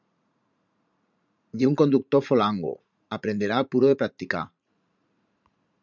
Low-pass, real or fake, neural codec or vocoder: 7.2 kHz; fake; vocoder, 44.1 kHz, 80 mel bands, Vocos